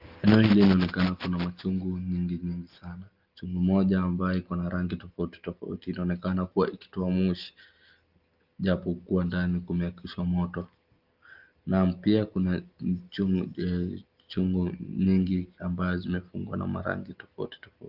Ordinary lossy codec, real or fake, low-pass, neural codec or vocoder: Opus, 32 kbps; real; 5.4 kHz; none